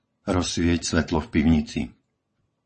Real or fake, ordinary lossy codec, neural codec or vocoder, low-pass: fake; MP3, 32 kbps; vocoder, 22.05 kHz, 80 mel bands, WaveNeXt; 9.9 kHz